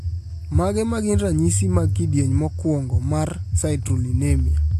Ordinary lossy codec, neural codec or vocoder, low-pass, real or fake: AAC, 64 kbps; none; 14.4 kHz; real